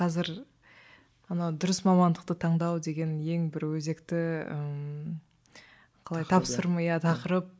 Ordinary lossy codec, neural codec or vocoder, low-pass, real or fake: none; none; none; real